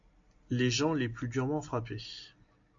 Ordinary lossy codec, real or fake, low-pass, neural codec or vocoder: MP3, 48 kbps; real; 7.2 kHz; none